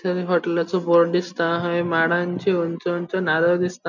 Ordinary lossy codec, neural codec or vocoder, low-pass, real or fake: none; none; 7.2 kHz; real